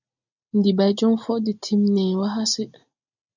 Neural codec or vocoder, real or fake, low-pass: none; real; 7.2 kHz